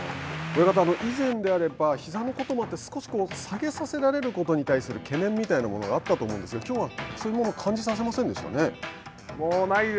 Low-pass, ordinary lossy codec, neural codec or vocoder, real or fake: none; none; none; real